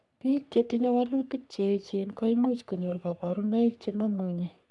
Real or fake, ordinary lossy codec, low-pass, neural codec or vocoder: fake; none; 10.8 kHz; codec, 44.1 kHz, 3.4 kbps, Pupu-Codec